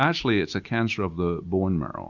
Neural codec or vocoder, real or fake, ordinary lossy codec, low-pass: none; real; Opus, 64 kbps; 7.2 kHz